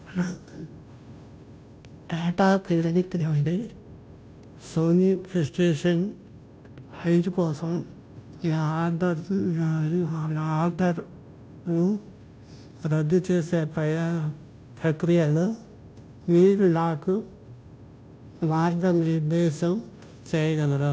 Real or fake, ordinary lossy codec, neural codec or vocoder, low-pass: fake; none; codec, 16 kHz, 0.5 kbps, FunCodec, trained on Chinese and English, 25 frames a second; none